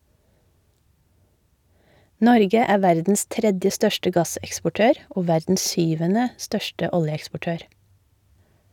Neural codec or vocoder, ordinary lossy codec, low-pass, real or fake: none; none; 19.8 kHz; real